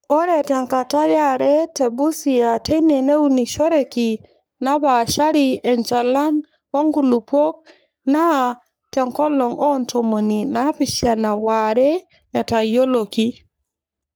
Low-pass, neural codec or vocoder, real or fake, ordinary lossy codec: none; codec, 44.1 kHz, 3.4 kbps, Pupu-Codec; fake; none